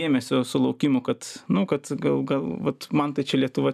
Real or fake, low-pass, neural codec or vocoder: real; 14.4 kHz; none